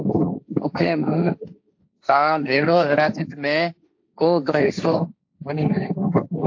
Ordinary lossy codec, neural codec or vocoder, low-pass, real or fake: AAC, 48 kbps; codec, 16 kHz, 1.1 kbps, Voila-Tokenizer; 7.2 kHz; fake